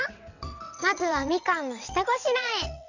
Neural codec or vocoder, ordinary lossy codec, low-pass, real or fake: vocoder, 22.05 kHz, 80 mel bands, WaveNeXt; none; 7.2 kHz; fake